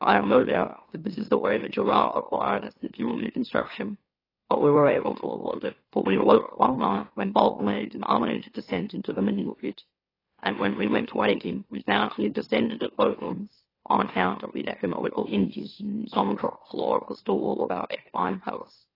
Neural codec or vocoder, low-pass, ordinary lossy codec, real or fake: autoencoder, 44.1 kHz, a latent of 192 numbers a frame, MeloTTS; 5.4 kHz; AAC, 24 kbps; fake